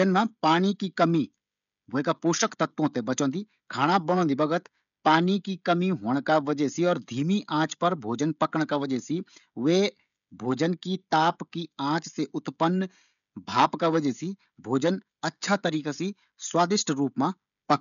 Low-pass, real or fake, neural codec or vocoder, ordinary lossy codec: 7.2 kHz; fake; codec, 16 kHz, 16 kbps, FreqCodec, smaller model; none